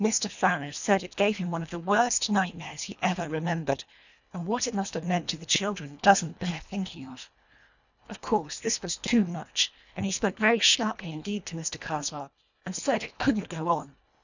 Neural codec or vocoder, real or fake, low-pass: codec, 24 kHz, 1.5 kbps, HILCodec; fake; 7.2 kHz